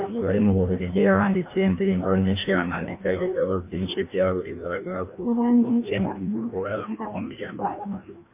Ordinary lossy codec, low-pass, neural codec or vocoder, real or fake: MP3, 24 kbps; 3.6 kHz; codec, 16 kHz, 1 kbps, FreqCodec, larger model; fake